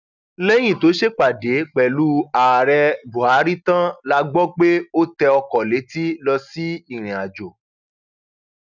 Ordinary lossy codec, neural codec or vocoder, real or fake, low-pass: none; none; real; 7.2 kHz